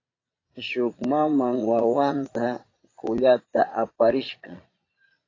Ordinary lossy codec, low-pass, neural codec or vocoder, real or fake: AAC, 32 kbps; 7.2 kHz; vocoder, 22.05 kHz, 80 mel bands, Vocos; fake